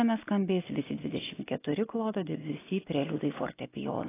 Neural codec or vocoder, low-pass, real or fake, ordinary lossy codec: none; 3.6 kHz; real; AAC, 16 kbps